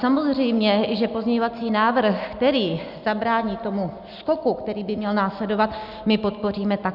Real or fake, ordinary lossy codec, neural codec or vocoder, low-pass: real; Opus, 64 kbps; none; 5.4 kHz